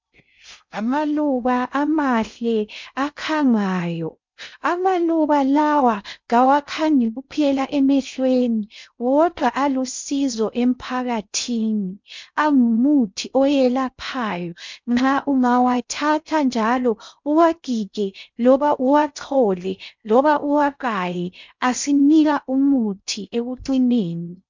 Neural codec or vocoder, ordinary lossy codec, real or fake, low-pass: codec, 16 kHz in and 24 kHz out, 0.6 kbps, FocalCodec, streaming, 2048 codes; MP3, 64 kbps; fake; 7.2 kHz